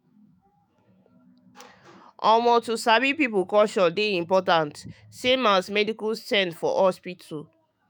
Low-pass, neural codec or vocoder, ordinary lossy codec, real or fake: none; autoencoder, 48 kHz, 128 numbers a frame, DAC-VAE, trained on Japanese speech; none; fake